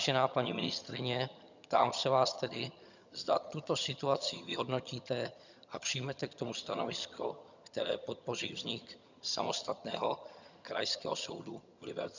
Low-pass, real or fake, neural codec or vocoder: 7.2 kHz; fake; vocoder, 22.05 kHz, 80 mel bands, HiFi-GAN